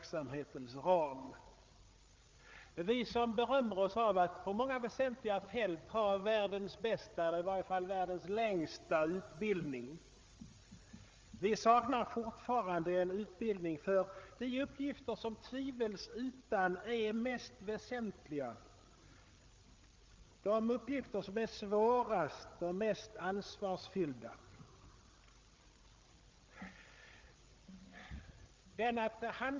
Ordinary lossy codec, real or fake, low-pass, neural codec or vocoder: Opus, 32 kbps; fake; 7.2 kHz; codec, 16 kHz, 8 kbps, FreqCodec, larger model